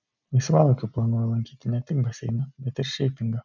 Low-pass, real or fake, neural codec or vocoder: 7.2 kHz; real; none